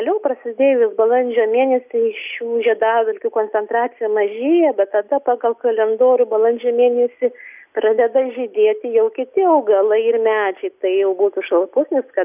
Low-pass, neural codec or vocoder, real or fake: 3.6 kHz; none; real